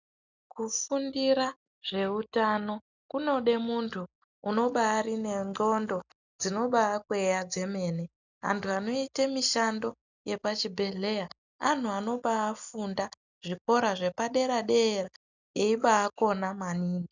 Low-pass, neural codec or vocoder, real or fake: 7.2 kHz; none; real